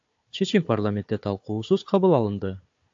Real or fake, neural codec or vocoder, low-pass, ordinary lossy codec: fake; codec, 16 kHz, 4 kbps, FunCodec, trained on Chinese and English, 50 frames a second; 7.2 kHz; AAC, 48 kbps